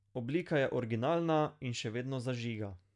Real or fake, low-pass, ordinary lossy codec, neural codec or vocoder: real; 10.8 kHz; none; none